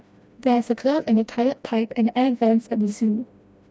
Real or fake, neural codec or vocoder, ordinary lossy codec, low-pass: fake; codec, 16 kHz, 1 kbps, FreqCodec, smaller model; none; none